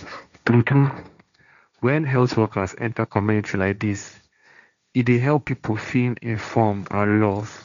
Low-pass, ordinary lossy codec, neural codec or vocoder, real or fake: 7.2 kHz; none; codec, 16 kHz, 1.1 kbps, Voila-Tokenizer; fake